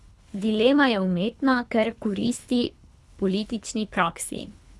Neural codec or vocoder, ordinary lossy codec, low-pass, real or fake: codec, 24 kHz, 3 kbps, HILCodec; none; none; fake